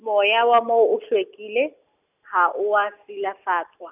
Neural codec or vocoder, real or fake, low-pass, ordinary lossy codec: none; real; 3.6 kHz; none